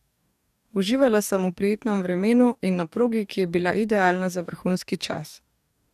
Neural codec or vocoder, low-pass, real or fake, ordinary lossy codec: codec, 44.1 kHz, 2.6 kbps, DAC; 14.4 kHz; fake; none